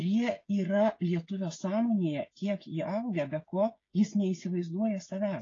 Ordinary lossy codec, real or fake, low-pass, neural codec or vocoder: AAC, 32 kbps; fake; 7.2 kHz; codec, 16 kHz, 16 kbps, FreqCodec, smaller model